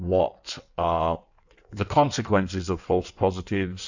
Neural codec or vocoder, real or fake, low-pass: codec, 16 kHz in and 24 kHz out, 1.1 kbps, FireRedTTS-2 codec; fake; 7.2 kHz